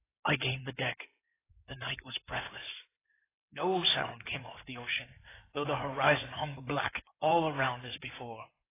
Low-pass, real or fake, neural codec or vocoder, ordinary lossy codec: 3.6 kHz; fake; codec, 16 kHz in and 24 kHz out, 2.2 kbps, FireRedTTS-2 codec; AAC, 16 kbps